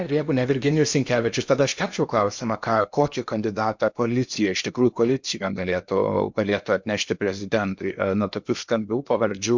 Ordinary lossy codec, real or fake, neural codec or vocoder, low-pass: MP3, 64 kbps; fake; codec, 16 kHz in and 24 kHz out, 0.8 kbps, FocalCodec, streaming, 65536 codes; 7.2 kHz